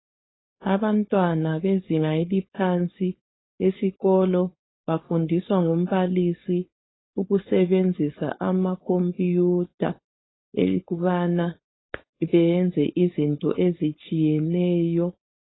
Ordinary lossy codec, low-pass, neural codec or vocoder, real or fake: AAC, 16 kbps; 7.2 kHz; codec, 16 kHz, 4.8 kbps, FACodec; fake